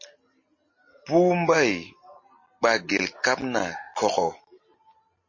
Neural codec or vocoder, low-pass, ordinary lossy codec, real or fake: none; 7.2 kHz; MP3, 32 kbps; real